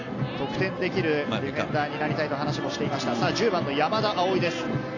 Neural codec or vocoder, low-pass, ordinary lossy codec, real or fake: none; 7.2 kHz; AAC, 48 kbps; real